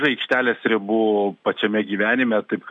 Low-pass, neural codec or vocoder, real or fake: 10.8 kHz; none; real